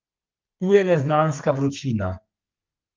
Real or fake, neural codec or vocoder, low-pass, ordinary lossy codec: fake; codec, 32 kHz, 1.9 kbps, SNAC; 7.2 kHz; Opus, 32 kbps